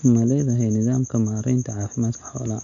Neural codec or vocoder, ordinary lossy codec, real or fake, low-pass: none; MP3, 64 kbps; real; 7.2 kHz